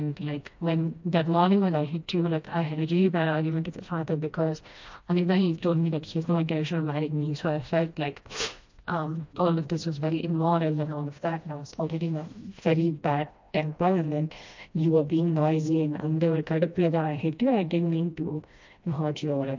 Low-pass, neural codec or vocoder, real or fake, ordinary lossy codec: 7.2 kHz; codec, 16 kHz, 1 kbps, FreqCodec, smaller model; fake; MP3, 48 kbps